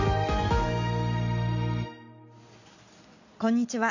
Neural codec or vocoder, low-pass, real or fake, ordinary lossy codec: none; 7.2 kHz; real; none